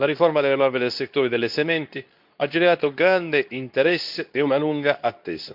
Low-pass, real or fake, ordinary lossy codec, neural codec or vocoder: 5.4 kHz; fake; none; codec, 24 kHz, 0.9 kbps, WavTokenizer, medium speech release version 2